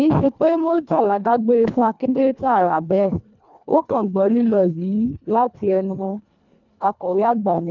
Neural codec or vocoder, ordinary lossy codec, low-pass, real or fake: codec, 24 kHz, 1.5 kbps, HILCodec; none; 7.2 kHz; fake